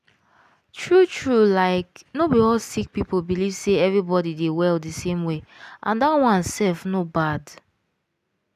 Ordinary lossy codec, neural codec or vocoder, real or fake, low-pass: none; none; real; 14.4 kHz